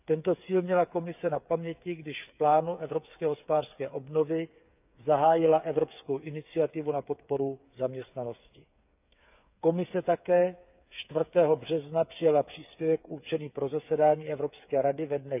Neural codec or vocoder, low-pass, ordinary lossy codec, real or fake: codec, 16 kHz, 8 kbps, FreqCodec, smaller model; 3.6 kHz; none; fake